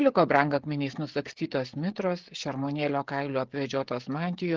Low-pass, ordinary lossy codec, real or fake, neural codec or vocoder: 7.2 kHz; Opus, 16 kbps; fake; codec, 16 kHz, 16 kbps, FreqCodec, smaller model